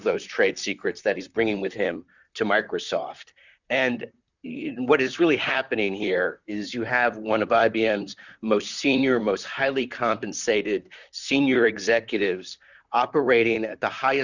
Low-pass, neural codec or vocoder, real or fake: 7.2 kHz; vocoder, 44.1 kHz, 128 mel bands, Pupu-Vocoder; fake